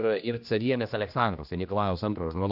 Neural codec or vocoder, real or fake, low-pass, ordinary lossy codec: codec, 16 kHz, 1 kbps, X-Codec, HuBERT features, trained on general audio; fake; 5.4 kHz; AAC, 48 kbps